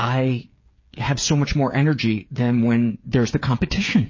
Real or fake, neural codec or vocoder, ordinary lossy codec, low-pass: fake; codec, 16 kHz, 8 kbps, FreqCodec, smaller model; MP3, 32 kbps; 7.2 kHz